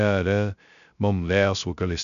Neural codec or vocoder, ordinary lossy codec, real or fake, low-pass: codec, 16 kHz, 0.2 kbps, FocalCodec; none; fake; 7.2 kHz